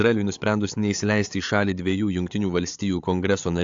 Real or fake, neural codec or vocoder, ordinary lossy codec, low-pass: fake; codec, 16 kHz, 8 kbps, FreqCodec, larger model; AAC, 64 kbps; 7.2 kHz